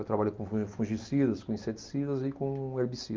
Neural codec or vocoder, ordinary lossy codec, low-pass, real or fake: none; Opus, 24 kbps; 7.2 kHz; real